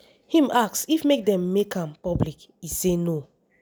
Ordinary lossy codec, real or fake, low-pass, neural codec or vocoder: none; real; none; none